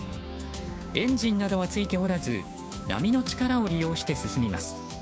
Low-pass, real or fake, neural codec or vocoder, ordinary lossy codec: none; fake; codec, 16 kHz, 6 kbps, DAC; none